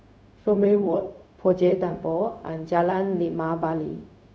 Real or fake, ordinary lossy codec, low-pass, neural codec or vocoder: fake; none; none; codec, 16 kHz, 0.4 kbps, LongCat-Audio-Codec